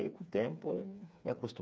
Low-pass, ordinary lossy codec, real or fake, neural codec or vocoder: none; none; fake; codec, 16 kHz, 4 kbps, FreqCodec, smaller model